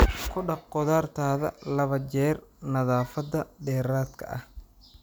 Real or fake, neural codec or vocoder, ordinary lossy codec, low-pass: real; none; none; none